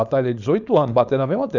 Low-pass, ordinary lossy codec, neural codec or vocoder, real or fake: 7.2 kHz; none; codec, 16 kHz, 4.8 kbps, FACodec; fake